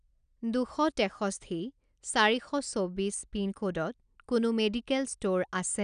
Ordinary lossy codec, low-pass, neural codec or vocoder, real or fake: none; 9.9 kHz; none; real